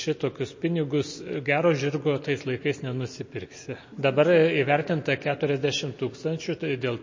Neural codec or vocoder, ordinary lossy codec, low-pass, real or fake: none; MP3, 32 kbps; 7.2 kHz; real